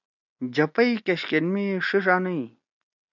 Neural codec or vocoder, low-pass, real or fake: none; 7.2 kHz; real